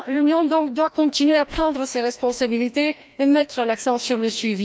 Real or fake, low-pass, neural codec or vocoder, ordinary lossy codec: fake; none; codec, 16 kHz, 1 kbps, FreqCodec, larger model; none